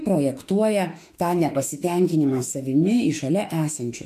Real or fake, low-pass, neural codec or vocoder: fake; 14.4 kHz; autoencoder, 48 kHz, 32 numbers a frame, DAC-VAE, trained on Japanese speech